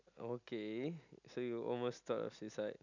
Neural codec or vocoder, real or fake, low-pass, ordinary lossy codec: none; real; 7.2 kHz; none